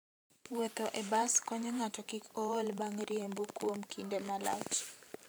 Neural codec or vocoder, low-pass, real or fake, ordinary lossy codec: vocoder, 44.1 kHz, 128 mel bands every 512 samples, BigVGAN v2; none; fake; none